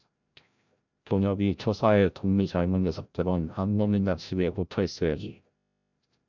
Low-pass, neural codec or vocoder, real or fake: 7.2 kHz; codec, 16 kHz, 0.5 kbps, FreqCodec, larger model; fake